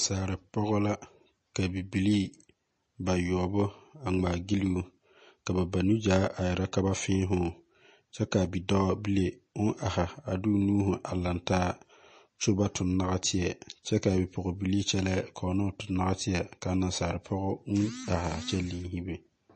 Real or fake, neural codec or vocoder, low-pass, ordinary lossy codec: real; none; 10.8 kHz; MP3, 32 kbps